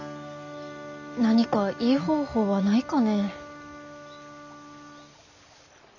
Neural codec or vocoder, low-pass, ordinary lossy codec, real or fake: none; 7.2 kHz; none; real